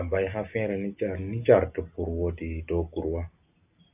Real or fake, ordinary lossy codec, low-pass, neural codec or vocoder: real; AAC, 32 kbps; 3.6 kHz; none